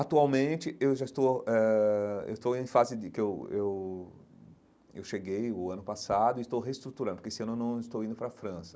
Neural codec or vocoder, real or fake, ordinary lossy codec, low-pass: none; real; none; none